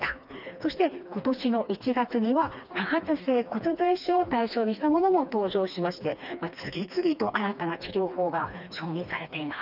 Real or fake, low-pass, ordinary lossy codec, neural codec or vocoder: fake; 5.4 kHz; none; codec, 16 kHz, 2 kbps, FreqCodec, smaller model